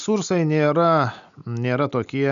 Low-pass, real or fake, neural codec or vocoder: 7.2 kHz; real; none